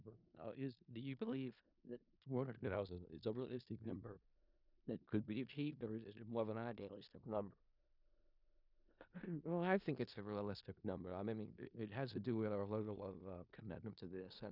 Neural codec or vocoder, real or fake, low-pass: codec, 16 kHz in and 24 kHz out, 0.4 kbps, LongCat-Audio-Codec, four codebook decoder; fake; 5.4 kHz